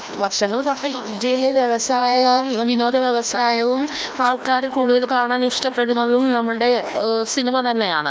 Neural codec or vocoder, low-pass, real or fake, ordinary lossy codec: codec, 16 kHz, 1 kbps, FreqCodec, larger model; none; fake; none